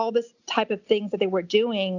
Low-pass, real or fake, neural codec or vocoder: 7.2 kHz; real; none